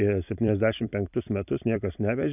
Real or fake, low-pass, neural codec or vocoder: fake; 3.6 kHz; vocoder, 44.1 kHz, 128 mel bands every 256 samples, BigVGAN v2